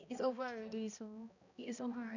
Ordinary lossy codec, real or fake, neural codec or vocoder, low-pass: none; fake; codec, 16 kHz, 1 kbps, X-Codec, HuBERT features, trained on balanced general audio; 7.2 kHz